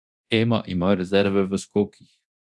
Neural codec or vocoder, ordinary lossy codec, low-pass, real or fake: codec, 24 kHz, 0.9 kbps, DualCodec; none; none; fake